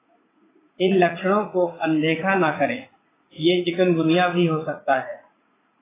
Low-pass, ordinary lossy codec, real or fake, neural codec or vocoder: 3.6 kHz; AAC, 16 kbps; fake; vocoder, 44.1 kHz, 80 mel bands, Vocos